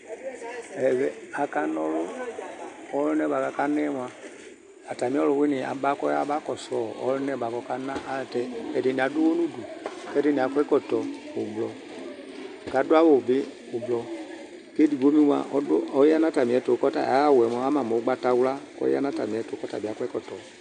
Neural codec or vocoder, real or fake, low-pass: none; real; 10.8 kHz